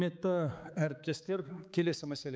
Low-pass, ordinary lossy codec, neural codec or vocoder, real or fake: none; none; codec, 16 kHz, 4 kbps, X-Codec, HuBERT features, trained on balanced general audio; fake